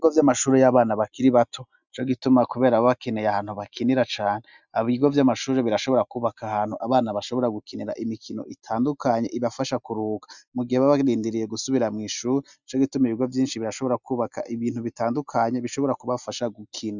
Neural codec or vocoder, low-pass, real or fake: none; 7.2 kHz; real